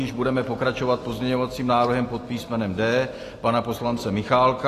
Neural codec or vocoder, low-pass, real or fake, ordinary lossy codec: none; 14.4 kHz; real; AAC, 48 kbps